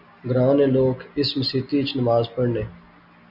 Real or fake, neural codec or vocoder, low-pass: real; none; 5.4 kHz